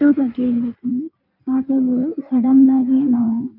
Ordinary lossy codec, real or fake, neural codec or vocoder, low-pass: none; fake; codec, 16 kHz in and 24 kHz out, 1.1 kbps, FireRedTTS-2 codec; 5.4 kHz